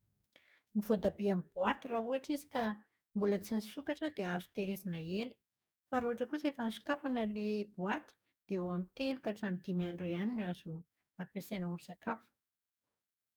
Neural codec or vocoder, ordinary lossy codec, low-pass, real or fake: codec, 44.1 kHz, 2.6 kbps, DAC; none; none; fake